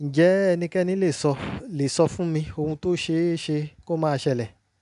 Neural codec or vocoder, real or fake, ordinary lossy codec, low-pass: none; real; none; 10.8 kHz